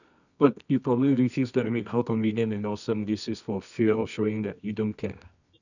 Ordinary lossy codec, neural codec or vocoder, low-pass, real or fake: none; codec, 24 kHz, 0.9 kbps, WavTokenizer, medium music audio release; 7.2 kHz; fake